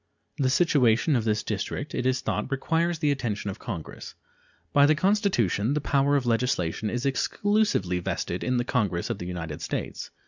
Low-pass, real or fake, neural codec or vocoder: 7.2 kHz; real; none